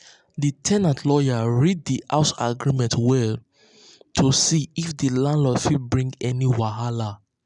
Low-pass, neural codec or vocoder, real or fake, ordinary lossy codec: 10.8 kHz; none; real; none